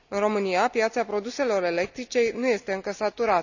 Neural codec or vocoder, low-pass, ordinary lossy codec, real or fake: none; 7.2 kHz; none; real